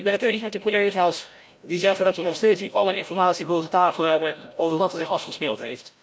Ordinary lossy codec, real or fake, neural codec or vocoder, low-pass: none; fake; codec, 16 kHz, 0.5 kbps, FreqCodec, larger model; none